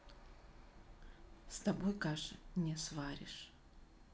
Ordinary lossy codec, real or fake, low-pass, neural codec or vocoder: none; real; none; none